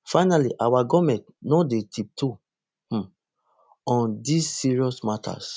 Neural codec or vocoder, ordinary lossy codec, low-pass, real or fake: none; none; none; real